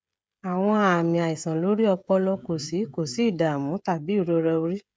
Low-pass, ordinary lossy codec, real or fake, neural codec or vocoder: none; none; fake; codec, 16 kHz, 16 kbps, FreqCodec, smaller model